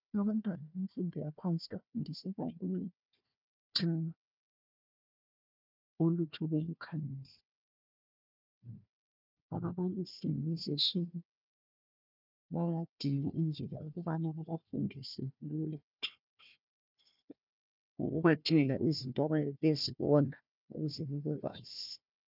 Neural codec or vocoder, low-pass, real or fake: codec, 16 kHz, 1 kbps, FunCodec, trained on Chinese and English, 50 frames a second; 5.4 kHz; fake